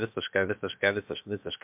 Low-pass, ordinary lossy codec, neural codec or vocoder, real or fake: 3.6 kHz; MP3, 32 kbps; codec, 16 kHz, about 1 kbps, DyCAST, with the encoder's durations; fake